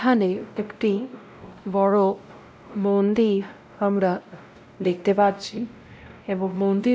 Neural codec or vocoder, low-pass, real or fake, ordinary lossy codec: codec, 16 kHz, 0.5 kbps, X-Codec, WavLM features, trained on Multilingual LibriSpeech; none; fake; none